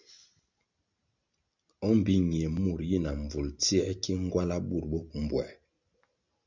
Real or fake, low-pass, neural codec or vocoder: real; 7.2 kHz; none